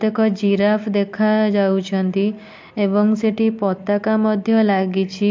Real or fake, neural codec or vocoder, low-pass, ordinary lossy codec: real; none; 7.2 kHz; MP3, 48 kbps